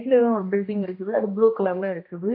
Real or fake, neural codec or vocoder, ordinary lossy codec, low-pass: fake; codec, 16 kHz, 1 kbps, X-Codec, HuBERT features, trained on general audio; MP3, 32 kbps; 5.4 kHz